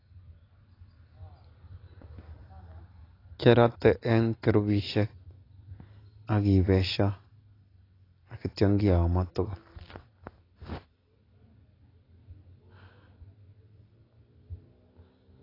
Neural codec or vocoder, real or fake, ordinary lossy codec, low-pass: none; real; AAC, 24 kbps; 5.4 kHz